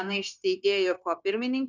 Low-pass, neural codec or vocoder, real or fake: 7.2 kHz; codec, 16 kHz, 0.9 kbps, LongCat-Audio-Codec; fake